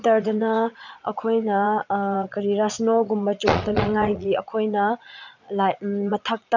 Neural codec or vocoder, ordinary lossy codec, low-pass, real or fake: vocoder, 22.05 kHz, 80 mel bands, Vocos; none; 7.2 kHz; fake